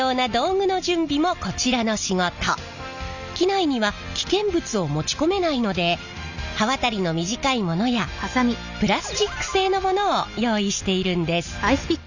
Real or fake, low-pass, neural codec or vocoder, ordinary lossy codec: real; 7.2 kHz; none; none